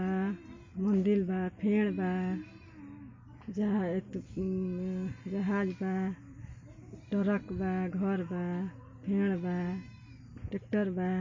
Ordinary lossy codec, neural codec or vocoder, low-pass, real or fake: MP3, 32 kbps; none; 7.2 kHz; real